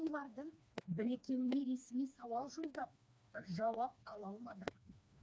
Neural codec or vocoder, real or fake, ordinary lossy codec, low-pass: codec, 16 kHz, 2 kbps, FreqCodec, smaller model; fake; none; none